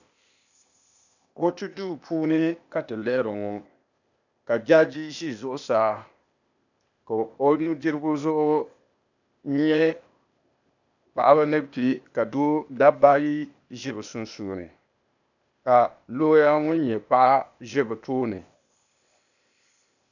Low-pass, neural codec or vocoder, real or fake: 7.2 kHz; codec, 16 kHz, 0.8 kbps, ZipCodec; fake